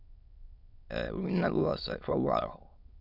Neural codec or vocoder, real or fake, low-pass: autoencoder, 22.05 kHz, a latent of 192 numbers a frame, VITS, trained on many speakers; fake; 5.4 kHz